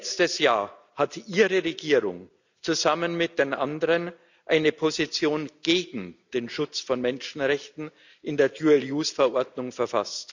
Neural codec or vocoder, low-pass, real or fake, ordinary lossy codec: none; 7.2 kHz; real; none